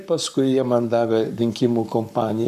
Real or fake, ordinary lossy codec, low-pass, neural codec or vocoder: fake; MP3, 96 kbps; 14.4 kHz; codec, 44.1 kHz, 7.8 kbps, Pupu-Codec